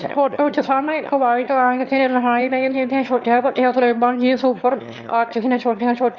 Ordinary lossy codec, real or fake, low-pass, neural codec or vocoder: Opus, 64 kbps; fake; 7.2 kHz; autoencoder, 22.05 kHz, a latent of 192 numbers a frame, VITS, trained on one speaker